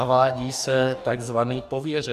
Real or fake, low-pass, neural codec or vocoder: fake; 14.4 kHz; codec, 44.1 kHz, 2.6 kbps, DAC